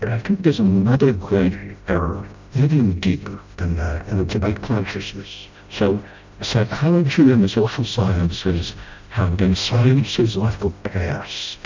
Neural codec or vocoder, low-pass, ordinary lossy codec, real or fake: codec, 16 kHz, 0.5 kbps, FreqCodec, smaller model; 7.2 kHz; MP3, 64 kbps; fake